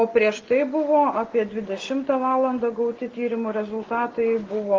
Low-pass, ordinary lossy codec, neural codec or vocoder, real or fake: 7.2 kHz; Opus, 16 kbps; none; real